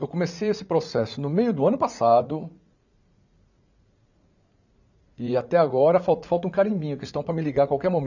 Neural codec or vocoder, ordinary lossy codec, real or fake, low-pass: vocoder, 44.1 kHz, 80 mel bands, Vocos; none; fake; 7.2 kHz